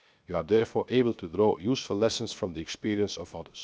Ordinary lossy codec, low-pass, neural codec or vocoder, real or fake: none; none; codec, 16 kHz, 0.7 kbps, FocalCodec; fake